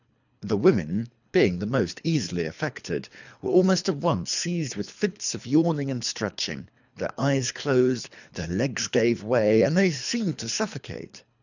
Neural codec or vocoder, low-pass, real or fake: codec, 24 kHz, 3 kbps, HILCodec; 7.2 kHz; fake